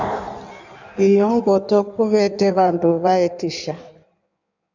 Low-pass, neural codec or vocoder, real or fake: 7.2 kHz; codec, 16 kHz in and 24 kHz out, 1.1 kbps, FireRedTTS-2 codec; fake